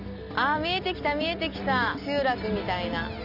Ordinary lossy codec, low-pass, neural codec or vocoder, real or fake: AAC, 48 kbps; 5.4 kHz; none; real